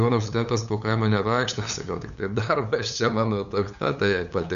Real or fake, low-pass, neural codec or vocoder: fake; 7.2 kHz; codec, 16 kHz, 8 kbps, FunCodec, trained on LibriTTS, 25 frames a second